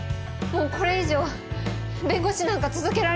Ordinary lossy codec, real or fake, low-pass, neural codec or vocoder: none; real; none; none